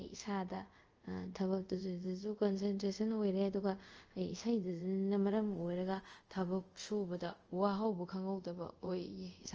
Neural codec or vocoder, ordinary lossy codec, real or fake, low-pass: codec, 24 kHz, 0.5 kbps, DualCodec; Opus, 24 kbps; fake; 7.2 kHz